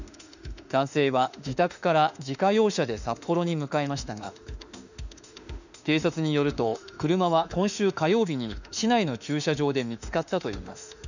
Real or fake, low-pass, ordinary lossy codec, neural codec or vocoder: fake; 7.2 kHz; none; autoencoder, 48 kHz, 32 numbers a frame, DAC-VAE, trained on Japanese speech